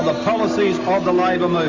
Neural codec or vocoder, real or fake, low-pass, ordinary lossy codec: none; real; 7.2 kHz; MP3, 48 kbps